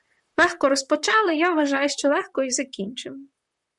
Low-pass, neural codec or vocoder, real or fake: 10.8 kHz; vocoder, 44.1 kHz, 128 mel bands, Pupu-Vocoder; fake